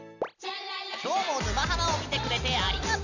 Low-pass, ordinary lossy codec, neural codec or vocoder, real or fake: 7.2 kHz; none; none; real